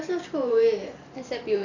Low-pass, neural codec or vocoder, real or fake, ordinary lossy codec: 7.2 kHz; vocoder, 44.1 kHz, 128 mel bands every 512 samples, BigVGAN v2; fake; none